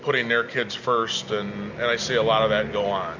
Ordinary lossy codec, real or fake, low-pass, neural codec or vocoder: MP3, 64 kbps; real; 7.2 kHz; none